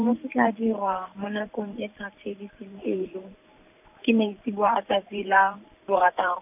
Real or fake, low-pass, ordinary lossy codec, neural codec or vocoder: fake; 3.6 kHz; none; vocoder, 44.1 kHz, 128 mel bands every 512 samples, BigVGAN v2